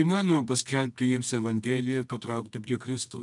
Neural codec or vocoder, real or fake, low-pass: codec, 24 kHz, 0.9 kbps, WavTokenizer, medium music audio release; fake; 10.8 kHz